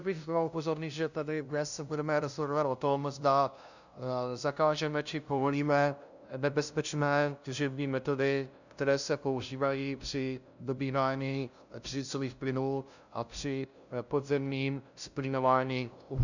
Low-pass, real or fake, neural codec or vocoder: 7.2 kHz; fake; codec, 16 kHz, 0.5 kbps, FunCodec, trained on LibriTTS, 25 frames a second